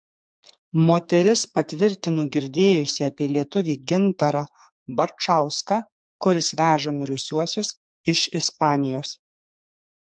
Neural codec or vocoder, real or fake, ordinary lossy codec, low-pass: codec, 44.1 kHz, 2.6 kbps, SNAC; fake; MP3, 64 kbps; 9.9 kHz